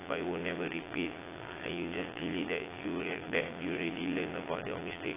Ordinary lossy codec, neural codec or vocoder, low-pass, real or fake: AAC, 16 kbps; vocoder, 22.05 kHz, 80 mel bands, Vocos; 3.6 kHz; fake